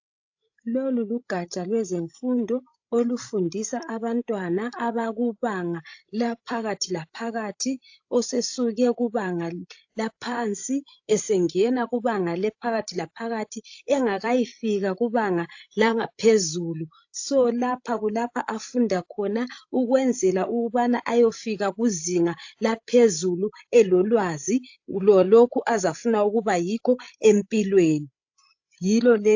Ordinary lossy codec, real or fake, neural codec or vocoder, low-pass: AAC, 48 kbps; fake; codec, 16 kHz, 16 kbps, FreqCodec, larger model; 7.2 kHz